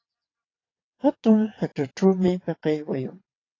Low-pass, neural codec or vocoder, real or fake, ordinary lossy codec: 7.2 kHz; vocoder, 22.05 kHz, 80 mel bands, WaveNeXt; fake; AAC, 32 kbps